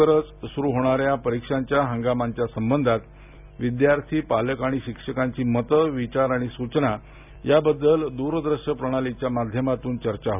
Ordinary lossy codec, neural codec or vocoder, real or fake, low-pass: none; none; real; 3.6 kHz